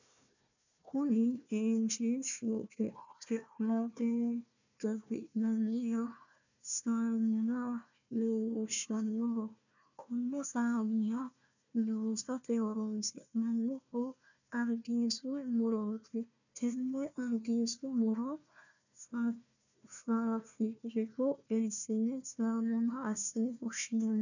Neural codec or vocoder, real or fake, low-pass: codec, 16 kHz, 1 kbps, FunCodec, trained on Chinese and English, 50 frames a second; fake; 7.2 kHz